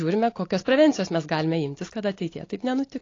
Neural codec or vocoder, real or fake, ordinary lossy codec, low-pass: none; real; AAC, 32 kbps; 7.2 kHz